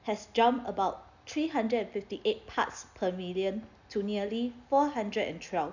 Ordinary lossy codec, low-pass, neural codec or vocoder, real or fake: none; 7.2 kHz; none; real